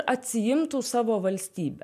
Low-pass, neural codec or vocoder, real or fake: 14.4 kHz; none; real